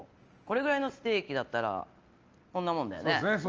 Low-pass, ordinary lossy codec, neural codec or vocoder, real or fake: 7.2 kHz; Opus, 24 kbps; none; real